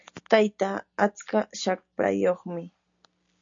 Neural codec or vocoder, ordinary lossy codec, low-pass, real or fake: none; MP3, 64 kbps; 7.2 kHz; real